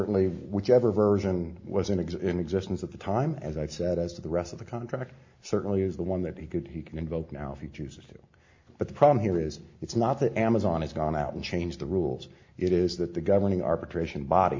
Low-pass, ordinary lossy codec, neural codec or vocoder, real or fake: 7.2 kHz; MP3, 48 kbps; none; real